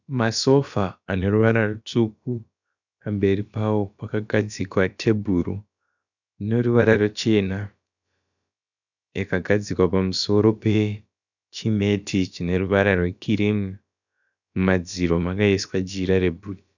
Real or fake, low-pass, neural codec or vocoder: fake; 7.2 kHz; codec, 16 kHz, about 1 kbps, DyCAST, with the encoder's durations